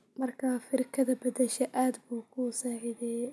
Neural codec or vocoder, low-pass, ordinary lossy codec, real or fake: none; none; none; real